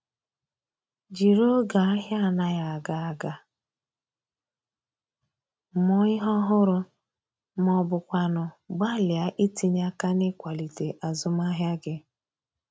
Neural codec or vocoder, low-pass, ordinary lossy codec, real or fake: none; none; none; real